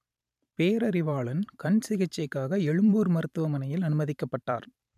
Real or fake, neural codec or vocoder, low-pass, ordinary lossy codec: fake; vocoder, 44.1 kHz, 128 mel bands every 512 samples, BigVGAN v2; 14.4 kHz; none